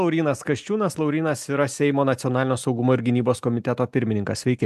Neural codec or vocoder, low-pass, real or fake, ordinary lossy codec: none; 14.4 kHz; real; AAC, 96 kbps